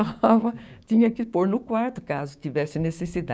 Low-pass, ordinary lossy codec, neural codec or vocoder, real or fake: none; none; codec, 16 kHz, 6 kbps, DAC; fake